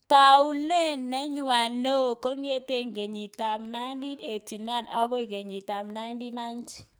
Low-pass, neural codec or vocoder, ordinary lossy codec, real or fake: none; codec, 44.1 kHz, 2.6 kbps, SNAC; none; fake